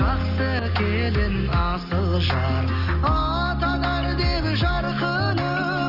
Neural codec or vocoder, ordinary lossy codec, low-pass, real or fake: none; Opus, 24 kbps; 5.4 kHz; real